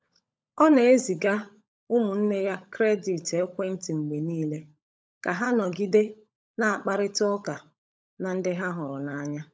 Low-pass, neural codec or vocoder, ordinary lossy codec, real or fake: none; codec, 16 kHz, 16 kbps, FunCodec, trained on LibriTTS, 50 frames a second; none; fake